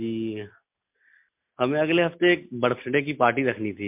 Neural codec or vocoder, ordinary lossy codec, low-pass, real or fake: none; MP3, 32 kbps; 3.6 kHz; real